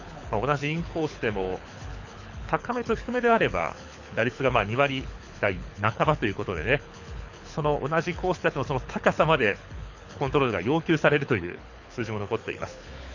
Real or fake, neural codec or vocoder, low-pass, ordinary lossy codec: fake; codec, 24 kHz, 6 kbps, HILCodec; 7.2 kHz; none